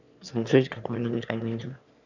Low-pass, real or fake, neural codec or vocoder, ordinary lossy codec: 7.2 kHz; fake; autoencoder, 22.05 kHz, a latent of 192 numbers a frame, VITS, trained on one speaker; none